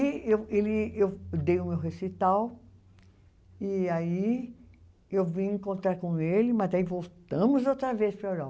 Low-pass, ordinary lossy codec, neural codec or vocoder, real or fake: none; none; none; real